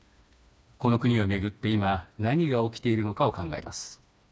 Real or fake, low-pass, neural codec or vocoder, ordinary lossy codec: fake; none; codec, 16 kHz, 2 kbps, FreqCodec, smaller model; none